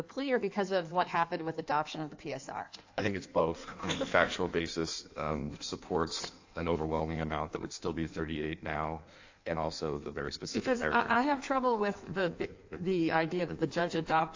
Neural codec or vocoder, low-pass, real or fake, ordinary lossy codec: codec, 16 kHz in and 24 kHz out, 1.1 kbps, FireRedTTS-2 codec; 7.2 kHz; fake; AAC, 48 kbps